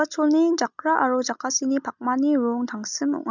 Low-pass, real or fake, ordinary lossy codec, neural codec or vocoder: 7.2 kHz; real; none; none